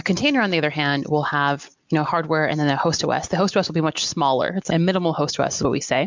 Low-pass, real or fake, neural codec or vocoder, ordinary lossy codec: 7.2 kHz; real; none; MP3, 64 kbps